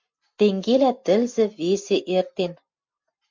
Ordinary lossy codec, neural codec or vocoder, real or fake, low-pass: MP3, 64 kbps; none; real; 7.2 kHz